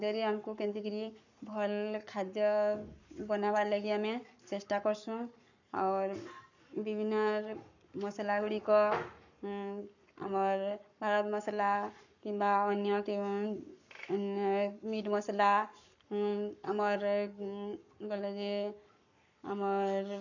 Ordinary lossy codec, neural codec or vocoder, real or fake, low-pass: none; codec, 44.1 kHz, 7.8 kbps, Pupu-Codec; fake; 7.2 kHz